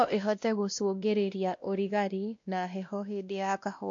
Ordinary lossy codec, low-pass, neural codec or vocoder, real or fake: MP3, 48 kbps; 7.2 kHz; codec, 16 kHz, 1 kbps, X-Codec, WavLM features, trained on Multilingual LibriSpeech; fake